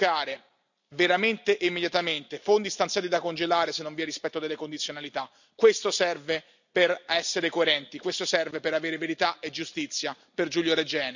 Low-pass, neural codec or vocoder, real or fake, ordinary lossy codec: 7.2 kHz; none; real; none